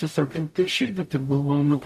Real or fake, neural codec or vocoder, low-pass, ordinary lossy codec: fake; codec, 44.1 kHz, 0.9 kbps, DAC; 14.4 kHz; AAC, 96 kbps